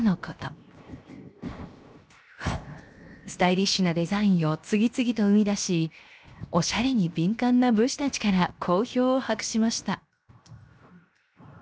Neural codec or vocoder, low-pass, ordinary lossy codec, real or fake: codec, 16 kHz, 0.7 kbps, FocalCodec; none; none; fake